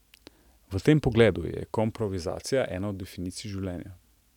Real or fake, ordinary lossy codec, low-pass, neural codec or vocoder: real; none; 19.8 kHz; none